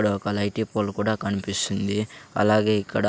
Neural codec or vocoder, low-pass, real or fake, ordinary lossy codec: none; none; real; none